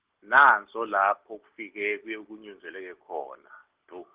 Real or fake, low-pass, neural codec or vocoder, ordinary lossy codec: real; 3.6 kHz; none; Opus, 16 kbps